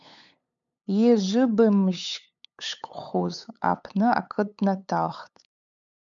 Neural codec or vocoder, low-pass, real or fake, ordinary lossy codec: codec, 16 kHz, 8 kbps, FunCodec, trained on LibriTTS, 25 frames a second; 7.2 kHz; fake; MP3, 64 kbps